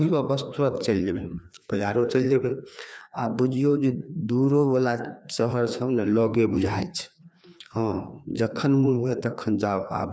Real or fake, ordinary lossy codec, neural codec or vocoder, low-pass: fake; none; codec, 16 kHz, 2 kbps, FreqCodec, larger model; none